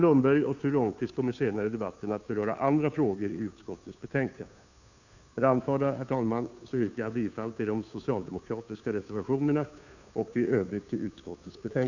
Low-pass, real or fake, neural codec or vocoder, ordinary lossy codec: 7.2 kHz; fake; codec, 16 kHz, 2 kbps, FunCodec, trained on Chinese and English, 25 frames a second; none